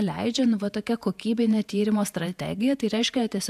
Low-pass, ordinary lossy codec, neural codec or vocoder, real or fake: 14.4 kHz; AAC, 96 kbps; vocoder, 48 kHz, 128 mel bands, Vocos; fake